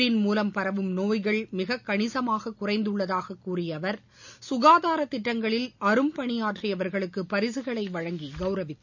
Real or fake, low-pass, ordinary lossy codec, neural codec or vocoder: real; 7.2 kHz; none; none